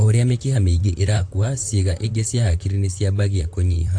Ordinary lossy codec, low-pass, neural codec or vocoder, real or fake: AAC, 64 kbps; 9.9 kHz; vocoder, 44.1 kHz, 128 mel bands, Pupu-Vocoder; fake